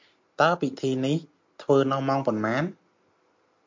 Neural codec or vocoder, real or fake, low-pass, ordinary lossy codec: none; real; 7.2 kHz; MP3, 48 kbps